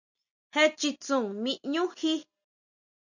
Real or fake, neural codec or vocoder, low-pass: real; none; 7.2 kHz